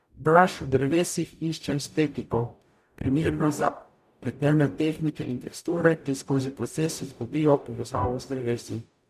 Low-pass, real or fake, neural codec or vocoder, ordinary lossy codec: 14.4 kHz; fake; codec, 44.1 kHz, 0.9 kbps, DAC; none